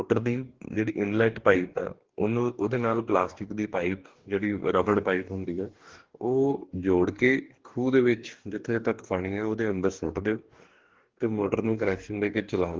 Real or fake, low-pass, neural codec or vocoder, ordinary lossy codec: fake; 7.2 kHz; codec, 44.1 kHz, 2.6 kbps, DAC; Opus, 16 kbps